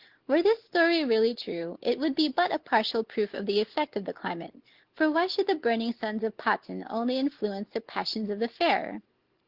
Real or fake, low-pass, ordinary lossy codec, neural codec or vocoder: real; 5.4 kHz; Opus, 16 kbps; none